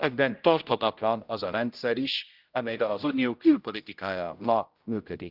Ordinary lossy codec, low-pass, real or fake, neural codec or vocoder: Opus, 24 kbps; 5.4 kHz; fake; codec, 16 kHz, 0.5 kbps, X-Codec, HuBERT features, trained on general audio